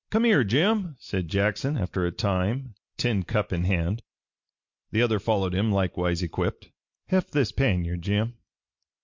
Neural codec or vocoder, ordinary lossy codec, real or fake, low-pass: none; MP3, 48 kbps; real; 7.2 kHz